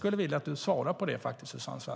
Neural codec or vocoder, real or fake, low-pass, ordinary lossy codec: none; real; none; none